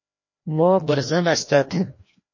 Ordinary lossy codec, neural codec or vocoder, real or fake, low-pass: MP3, 32 kbps; codec, 16 kHz, 1 kbps, FreqCodec, larger model; fake; 7.2 kHz